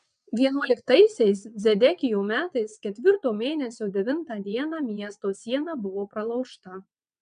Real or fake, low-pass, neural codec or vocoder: fake; 9.9 kHz; vocoder, 22.05 kHz, 80 mel bands, WaveNeXt